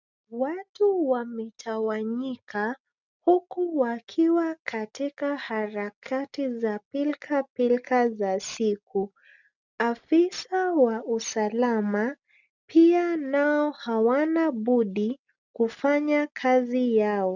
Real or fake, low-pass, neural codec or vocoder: real; 7.2 kHz; none